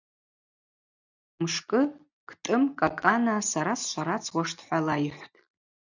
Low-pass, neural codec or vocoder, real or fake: 7.2 kHz; none; real